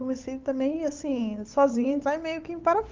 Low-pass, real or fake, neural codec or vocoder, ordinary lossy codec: 7.2 kHz; fake; codec, 16 kHz, 8 kbps, FunCodec, trained on Chinese and English, 25 frames a second; Opus, 24 kbps